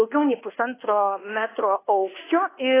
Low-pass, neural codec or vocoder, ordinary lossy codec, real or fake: 3.6 kHz; codec, 24 kHz, 1.2 kbps, DualCodec; AAC, 16 kbps; fake